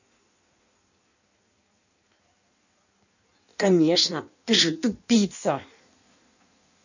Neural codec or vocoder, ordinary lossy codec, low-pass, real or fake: codec, 16 kHz in and 24 kHz out, 1.1 kbps, FireRedTTS-2 codec; none; 7.2 kHz; fake